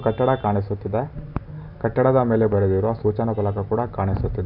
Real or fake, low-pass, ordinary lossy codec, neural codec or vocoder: real; 5.4 kHz; AAC, 48 kbps; none